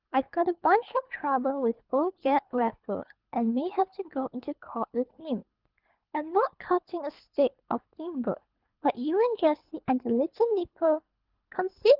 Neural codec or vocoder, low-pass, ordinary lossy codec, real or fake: codec, 24 kHz, 3 kbps, HILCodec; 5.4 kHz; Opus, 24 kbps; fake